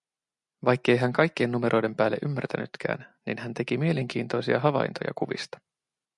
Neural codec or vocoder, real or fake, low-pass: none; real; 10.8 kHz